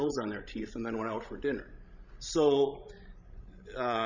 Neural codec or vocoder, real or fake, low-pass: codec, 16 kHz, 16 kbps, FreqCodec, larger model; fake; 7.2 kHz